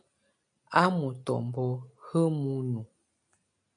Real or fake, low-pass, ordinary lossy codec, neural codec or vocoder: real; 9.9 kHz; MP3, 64 kbps; none